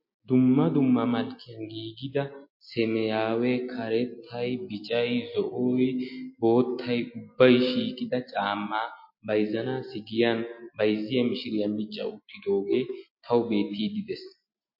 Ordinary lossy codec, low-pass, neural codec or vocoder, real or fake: MP3, 32 kbps; 5.4 kHz; none; real